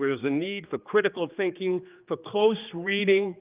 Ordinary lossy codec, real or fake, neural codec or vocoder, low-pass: Opus, 32 kbps; fake; codec, 16 kHz, 2 kbps, X-Codec, HuBERT features, trained on general audio; 3.6 kHz